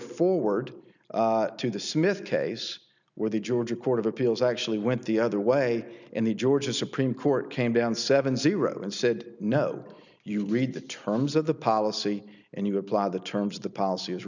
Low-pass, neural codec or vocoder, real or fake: 7.2 kHz; none; real